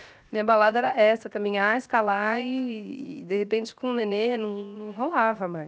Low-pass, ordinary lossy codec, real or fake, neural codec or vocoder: none; none; fake; codec, 16 kHz, 0.7 kbps, FocalCodec